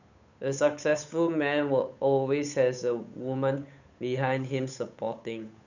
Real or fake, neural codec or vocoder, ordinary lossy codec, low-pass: fake; codec, 16 kHz, 8 kbps, FunCodec, trained on Chinese and English, 25 frames a second; none; 7.2 kHz